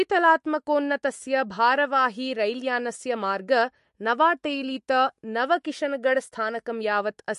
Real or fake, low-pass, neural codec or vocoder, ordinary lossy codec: fake; 14.4 kHz; autoencoder, 48 kHz, 128 numbers a frame, DAC-VAE, trained on Japanese speech; MP3, 48 kbps